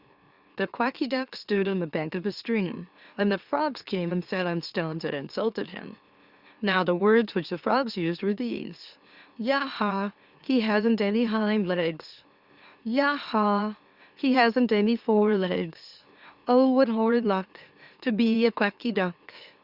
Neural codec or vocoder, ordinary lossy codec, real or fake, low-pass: autoencoder, 44.1 kHz, a latent of 192 numbers a frame, MeloTTS; Opus, 64 kbps; fake; 5.4 kHz